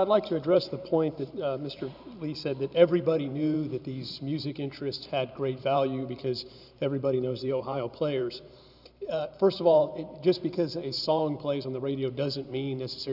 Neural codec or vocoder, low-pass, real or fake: vocoder, 44.1 kHz, 128 mel bands every 512 samples, BigVGAN v2; 5.4 kHz; fake